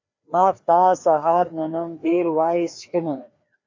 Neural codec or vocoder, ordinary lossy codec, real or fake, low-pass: codec, 16 kHz, 2 kbps, FreqCodec, larger model; AAC, 48 kbps; fake; 7.2 kHz